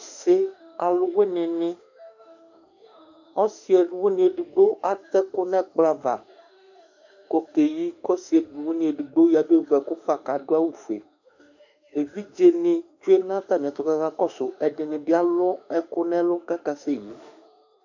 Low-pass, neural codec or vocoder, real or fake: 7.2 kHz; autoencoder, 48 kHz, 32 numbers a frame, DAC-VAE, trained on Japanese speech; fake